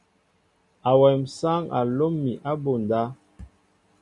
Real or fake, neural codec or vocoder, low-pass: real; none; 10.8 kHz